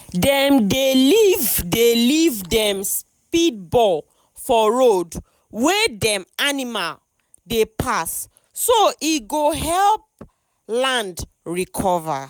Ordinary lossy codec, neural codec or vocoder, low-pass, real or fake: none; none; none; real